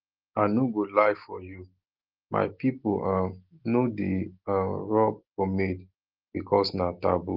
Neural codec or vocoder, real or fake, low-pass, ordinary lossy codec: none; real; 5.4 kHz; Opus, 16 kbps